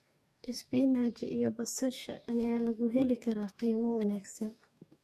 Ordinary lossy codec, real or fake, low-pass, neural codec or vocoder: MP3, 96 kbps; fake; 14.4 kHz; codec, 44.1 kHz, 2.6 kbps, DAC